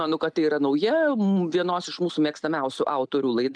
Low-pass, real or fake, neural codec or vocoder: 9.9 kHz; real; none